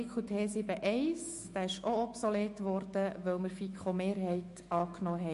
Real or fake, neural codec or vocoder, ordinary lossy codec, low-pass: fake; autoencoder, 48 kHz, 128 numbers a frame, DAC-VAE, trained on Japanese speech; MP3, 48 kbps; 14.4 kHz